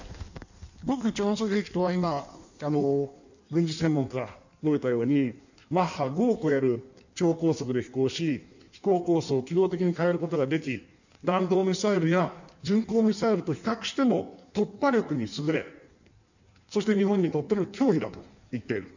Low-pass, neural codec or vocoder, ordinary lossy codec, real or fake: 7.2 kHz; codec, 16 kHz in and 24 kHz out, 1.1 kbps, FireRedTTS-2 codec; none; fake